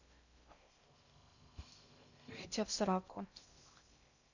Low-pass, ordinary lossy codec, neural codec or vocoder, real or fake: 7.2 kHz; none; codec, 16 kHz in and 24 kHz out, 0.6 kbps, FocalCodec, streaming, 2048 codes; fake